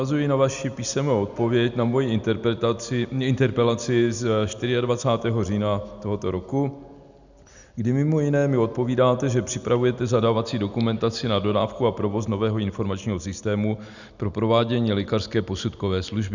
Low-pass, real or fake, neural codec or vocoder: 7.2 kHz; real; none